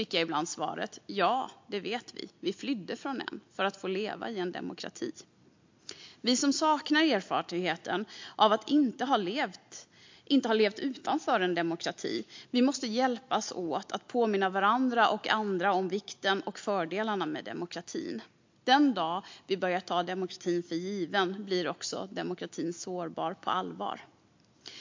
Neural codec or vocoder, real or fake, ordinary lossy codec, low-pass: none; real; MP3, 48 kbps; 7.2 kHz